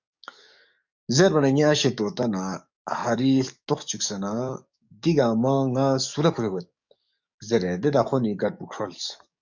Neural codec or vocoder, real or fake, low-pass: codec, 44.1 kHz, 7.8 kbps, DAC; fake; 7.2 kHz